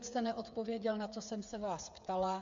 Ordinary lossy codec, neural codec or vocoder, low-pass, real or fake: AAC, 64 kbps; codec, 16 kHz, 8 kbps, FreqCodec, smaller model; 7.2 kHz; fake